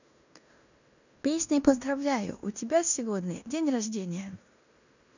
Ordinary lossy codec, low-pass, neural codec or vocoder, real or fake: none; 7.2 kHz; codec, 16 kHz in and 24 kHz out, 0.9 kbps, LongCat-Audio-Codec, fine tuned four codebook decoder; fake